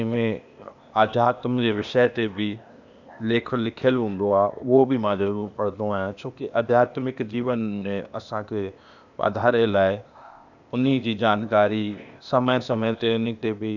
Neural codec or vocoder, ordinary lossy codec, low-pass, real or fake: codec, 16 kHz, 0.8 kbps, ZipCodec; none; 7.2 kHz; fake